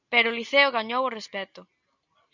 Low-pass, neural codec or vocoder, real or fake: 7.2 kHz; none; real